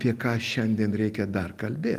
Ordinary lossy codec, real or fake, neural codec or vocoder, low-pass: Opus, 24 kbps; real; none; 14.4 kHz